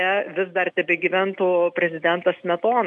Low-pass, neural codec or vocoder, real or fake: 10.8 kHz; none; real